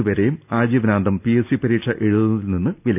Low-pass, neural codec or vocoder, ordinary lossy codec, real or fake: 3.6 kHz; none; none; real